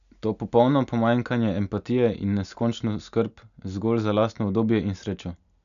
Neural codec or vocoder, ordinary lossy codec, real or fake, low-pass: none; none; real; 7.2 kHz